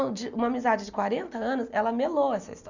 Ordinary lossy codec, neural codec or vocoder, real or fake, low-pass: none; none; real; 7.2 kHz